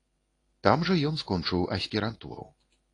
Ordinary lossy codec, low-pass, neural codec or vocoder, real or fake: AAC, 32 kbps; 10.8 kHz; codec, 24 kHz, 0.9 kbps, WavTokenizer, medium speech release version 1; fake